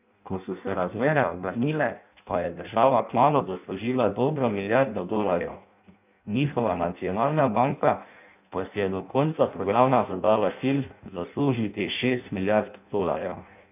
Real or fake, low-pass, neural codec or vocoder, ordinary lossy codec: fake; 3.6 kHz; codec, 16 kHz in and 24 kHz out, 0.6 kbps, FireRedTTS-2 codec; none